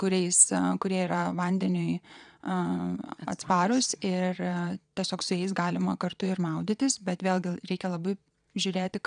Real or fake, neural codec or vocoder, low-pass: fake; vocoder, 22.05 kHz, 80 mel bands, Vocos; 9.9 kHz